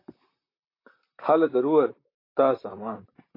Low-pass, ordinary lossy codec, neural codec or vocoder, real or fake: 5.4 kHz; AAC, 32 kbps; vocoder, 44.1 kHz, 128 mel bands, Pupu-Vocoder; fake